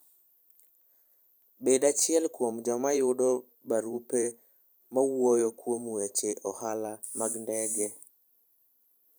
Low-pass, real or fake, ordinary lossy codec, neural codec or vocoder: none; fake; none; vocoder, 44.1 kHz, 128 mel bands every 512 samples, BigVGAN v2